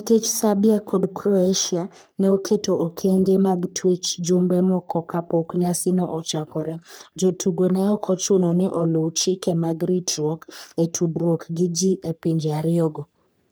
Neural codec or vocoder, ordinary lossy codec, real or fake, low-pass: codec, 44.1 kHz, 3.4 kbps, Pupu-Codec; none; fake; none